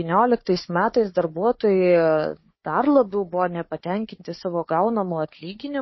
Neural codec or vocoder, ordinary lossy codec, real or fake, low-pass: codec, 16 kHz, 4 kbps, FunCodec, trained on Chinese and English, 50 frames a second; MP3, 24 kbps; fake; 7.2 kHz